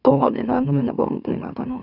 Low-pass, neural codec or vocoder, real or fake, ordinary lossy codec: 5.4 kHz; autoencoder, 44.1 kHz, a latent of 192 numbers a frame, MeloTTS; fake; none